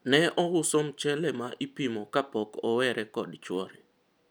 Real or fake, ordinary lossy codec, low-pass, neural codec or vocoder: real; none; none; none